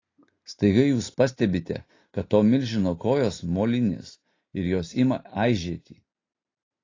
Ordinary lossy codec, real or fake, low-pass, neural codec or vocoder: AAC, 32 kbps; real; 7.2 kHz; none